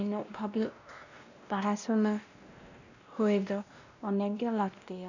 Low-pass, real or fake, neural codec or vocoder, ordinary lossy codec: 7.2 kHz; fake; codec, 16 kHz, 1 kbps, X-Codec, WavLM features, trained on Multilingual LibriSpeech; none